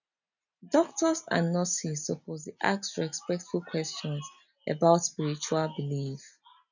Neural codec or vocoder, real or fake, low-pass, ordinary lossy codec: none; real; 7.2 kHz; none